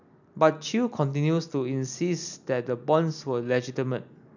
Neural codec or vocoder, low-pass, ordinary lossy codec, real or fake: none; 7.2 kHz; none; real